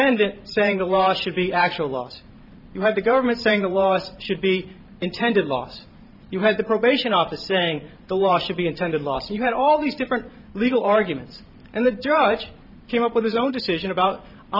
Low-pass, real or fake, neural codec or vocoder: 5.4 kHz; fake; vocoder, 44.1 kHz, 128 mel bands every 512 samples, BigVGAN v2